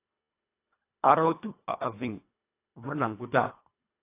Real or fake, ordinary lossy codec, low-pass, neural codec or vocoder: fake; AAC, 24 kbps; 3.6 kHz; codec, 24 kHz, 1.5 kbps, HILCodec